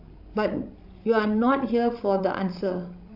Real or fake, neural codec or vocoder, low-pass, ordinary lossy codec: fake; codec, 16 kHz, 16 kbps, FreqCodec, larger model; 5.4 kHz; AAC, 48 kbps